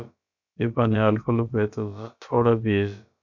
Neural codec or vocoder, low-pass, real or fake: codec, 16 kHz, about 1 kbps, DyCAST, with the encoder's durations; 7.2 kHz; fake